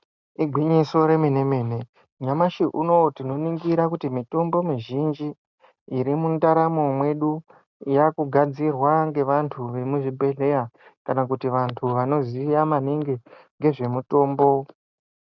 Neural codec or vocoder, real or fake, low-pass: none; real; 7.2 kHz